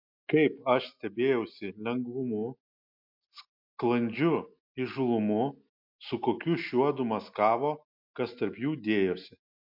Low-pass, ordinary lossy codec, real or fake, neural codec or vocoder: 5.4 kHz; MP3, 48 kbps; real; none